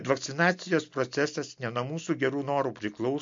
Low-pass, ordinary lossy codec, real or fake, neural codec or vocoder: 7.2 kHz; MP3, 48 kbps; real; none